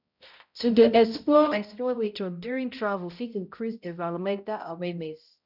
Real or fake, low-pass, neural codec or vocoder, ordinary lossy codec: fake; 5.4 kHz; codec, 16 kHz, 0.5 kbps, X-Codec, HuBERT features, trained on balanced general audio; none